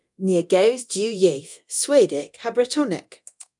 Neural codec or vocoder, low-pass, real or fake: codec, 24 kHz, 0.9 kbps, DualCodec; 10.8 kHz; fake